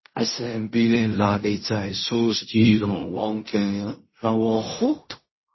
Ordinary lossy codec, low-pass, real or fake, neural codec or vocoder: MP3, 24 kbps; 7.2 kHz; fake; codec, 16 kHz in and 24 kHz out, 0.4 kbps, LongCat-Audio-Codec, fine tuned four codebook decoder